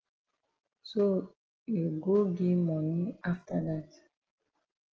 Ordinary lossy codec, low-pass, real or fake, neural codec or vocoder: Opus, 16 kbps; 7.2 kHz; real; none